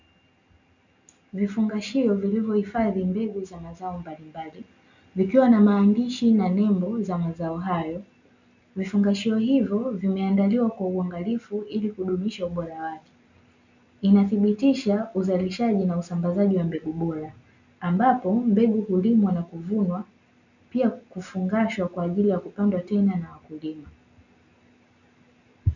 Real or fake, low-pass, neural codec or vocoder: real; 7.2 kHz; none